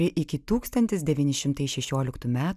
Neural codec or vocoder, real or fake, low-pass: none; real; 14.4 kHz